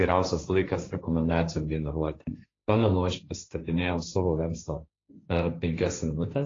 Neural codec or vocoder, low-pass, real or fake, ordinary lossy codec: codec, 16 kHz, 1.1 kbps, Voila-Tokenizer; 7.2 kHz; fake; AAC, 32 kbps